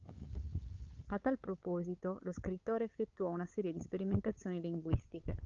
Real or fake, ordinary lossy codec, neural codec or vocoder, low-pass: fake; Opus, 24 kbps; codec, 16 kHz, 8 kbps, FunCodec, trained on LibriTTS, 25 frames a second; 7.2 kHz